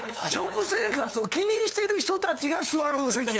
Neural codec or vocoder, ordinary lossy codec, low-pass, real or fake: codec, 16 kHz, 2 kbps, FunCodec, trained on LibriTTS, 25 frames a second; none; none; fake